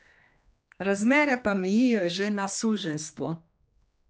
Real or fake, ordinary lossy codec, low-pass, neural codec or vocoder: fake; none; none; codec, 16 kHz, 2 kbps, X-Codec, HuBERT features, trained on general audio